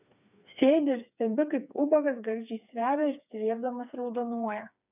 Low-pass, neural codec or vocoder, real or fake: 3.6 kHz; codec, 16 kHz, 4 kbps, FreqCodec, smaller model; fake